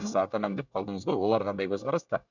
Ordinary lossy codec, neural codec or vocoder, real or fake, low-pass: none; codec, 24 kHz, 1 kbps, SNAC; fake; 7.2 kHz